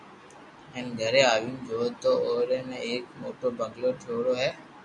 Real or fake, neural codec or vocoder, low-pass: real; none; 10.8 kHz